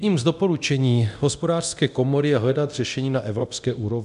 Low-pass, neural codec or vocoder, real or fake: 10.8 kHz; codec, 24 kHz, 0.9 kbps, DualCodec; fake